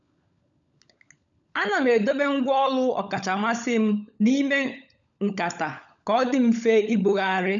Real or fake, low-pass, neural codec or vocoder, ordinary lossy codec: fake; 7.2 kHz; codec, 16 kHz, 16 kbps, FunCodec, trained on LibriTTS, 50 frames a second; none